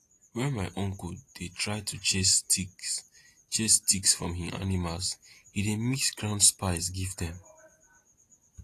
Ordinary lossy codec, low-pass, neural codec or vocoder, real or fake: AAC, 48 kbps; 14.4 kHz; none; real